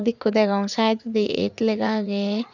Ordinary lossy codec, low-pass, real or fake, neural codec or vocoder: none; 7.2 kHz; fake; vocoder, 44.1 kHz, 80 mel bands, Vocos